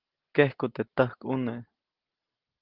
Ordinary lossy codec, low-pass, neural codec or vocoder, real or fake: Opus, 16 kbps; 5.4 kHz; none; real